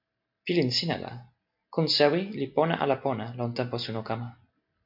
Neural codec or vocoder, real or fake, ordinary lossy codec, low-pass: none; real; MP3, 48 kbps; 5.4 kHz